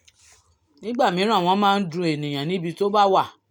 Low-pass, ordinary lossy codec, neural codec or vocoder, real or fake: 19.8 kHz; none; none; real